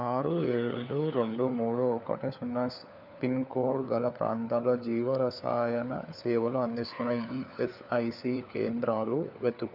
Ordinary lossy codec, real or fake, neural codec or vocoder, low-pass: none; fake; codec, 16 kHz, 4 kbps, FunCodec, trained on LibriTTS, 50 frames a second; 5.4 kHz